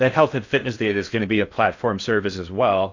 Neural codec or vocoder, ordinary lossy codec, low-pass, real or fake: codec, 16 kHz in and 24 kHz out, 0.6 kbps, FocalCodec, streaming, 4096 codes; AAC, 48 kbps; 7.2 kHz; fake